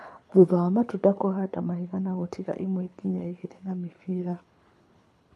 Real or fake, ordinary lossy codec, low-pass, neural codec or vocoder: fake; none; none; codec, 24 kHz, 6 kbps, HILCodec